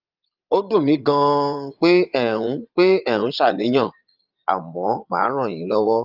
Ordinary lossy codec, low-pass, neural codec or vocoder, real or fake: Opus, 32 kbps; 5.4 kHz; vocoder, 22.05 kHz, 80 mel bands, Vocos; fake